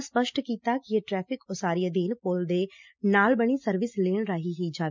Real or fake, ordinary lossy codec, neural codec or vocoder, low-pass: real; MP3, 64 kbps; none; 7.2 kHz